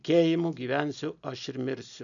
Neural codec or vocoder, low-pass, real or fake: none; 7.2 kHz; real